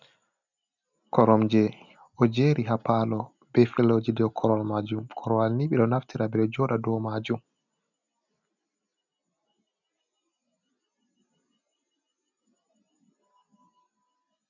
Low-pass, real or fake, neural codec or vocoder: 7.2 kHz; real; none